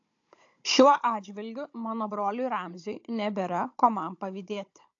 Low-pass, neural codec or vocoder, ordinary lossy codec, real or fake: 7.2 kHz; codec, 16 kHz, 16 kbps, FunCodec, trained on Chinese and English, 50 frames a second; AAC, 48 kbps; fake